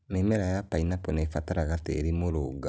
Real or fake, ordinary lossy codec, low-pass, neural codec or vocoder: real; none; none; none